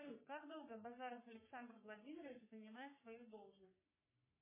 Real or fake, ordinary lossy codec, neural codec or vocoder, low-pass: fake; MP3, 16 kbps; codec, 44.1 kHz, 3.4 kbps, Pupu-Codec; 3.6 kHz